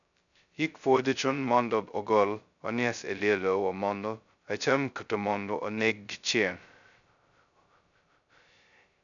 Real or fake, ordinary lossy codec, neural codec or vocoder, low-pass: fake; none; codec, 16 kHz, 0.2 kbps, FocalCodec; 7.2 kHz